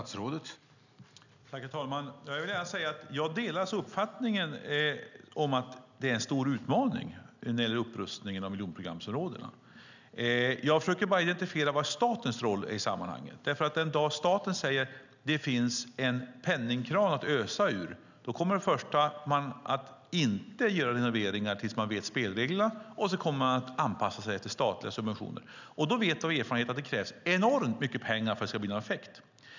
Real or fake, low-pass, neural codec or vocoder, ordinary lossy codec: real; 7.2 kHz; none; none